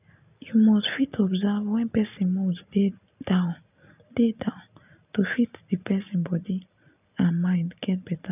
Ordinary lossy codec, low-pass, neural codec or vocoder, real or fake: none; 3.6 kHz; none; real